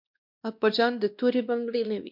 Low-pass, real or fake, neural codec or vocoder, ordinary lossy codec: 5.4 kHz; fake; codec, 16 kHz, 1 kbps, X-Codec, WavLM features, trained on Multilingual LibriSpeech; MP3, 48 kbps